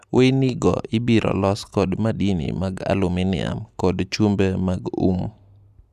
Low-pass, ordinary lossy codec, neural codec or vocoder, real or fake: 14.4 kHz; none; none; real